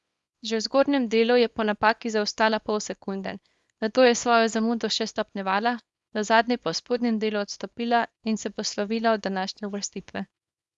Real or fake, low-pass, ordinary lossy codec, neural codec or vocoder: fake; none; none; codec, 24 kHz, 0.9 kbps, WavTokenizer, small release